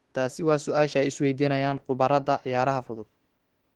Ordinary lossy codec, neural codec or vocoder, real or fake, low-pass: Opus, 16 kbps; autoencoder, 48 kHz, 32 numbers a frame, DAC-VAE, trained on Japanese speech; fake; 19.8 kHz